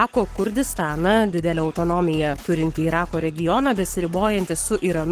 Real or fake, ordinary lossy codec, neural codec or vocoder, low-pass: fake; Opus, 16 kbps; codec, 44.1 kHz, 7.8 kbps, Pupu-Codec; 14.4 kHz